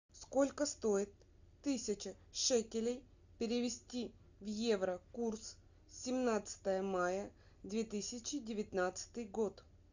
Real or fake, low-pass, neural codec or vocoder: real; 7.2 kHz; none